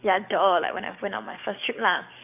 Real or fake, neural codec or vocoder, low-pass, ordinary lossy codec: fake; codec, 44.1 kHz, 7.8 kbps, DAC; 3.6 kHz; none